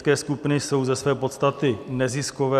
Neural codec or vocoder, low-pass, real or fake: none; 14.4 kHz; real